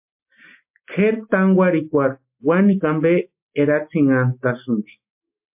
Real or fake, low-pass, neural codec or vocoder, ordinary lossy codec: real; 3.6 kHz; none; MP3, 32 kbps